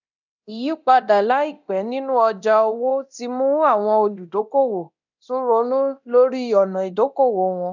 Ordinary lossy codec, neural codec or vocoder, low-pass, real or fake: none; codec, 24 kHz, 0.9 kbps, DualCodec; 7.2 kHz; fake